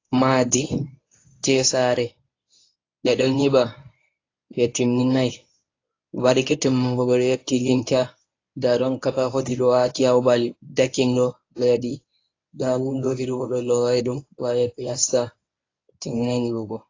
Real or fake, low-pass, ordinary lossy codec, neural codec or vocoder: fake; 7.2 kHz; AAC, 32 kbps; codec, 24 kHz, 0.9 kbps, WavTokenizer, medium speech release version 1